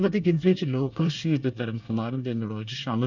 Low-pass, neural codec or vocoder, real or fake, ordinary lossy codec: 7.2 kHz; codec, 24 kHz, 1 kbps, SNAC; fake; none